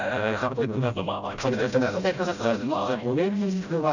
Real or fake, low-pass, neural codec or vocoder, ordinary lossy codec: fake; 7.2 kHz; codec, 16 kHz, 0.5 kbps, FreqCodec, smaller model; none